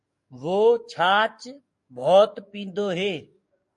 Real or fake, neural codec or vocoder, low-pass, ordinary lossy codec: fake; codec, 44.1 kHz, 7.8 kbps, DAC; 10.8 kHz; MP3, 48 kbps